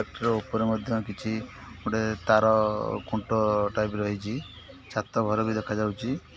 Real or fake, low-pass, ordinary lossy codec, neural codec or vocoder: real; none; none; none